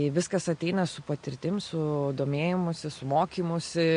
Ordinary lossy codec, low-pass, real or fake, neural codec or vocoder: MP3, 48 kbps; 9.9 kHz; real; none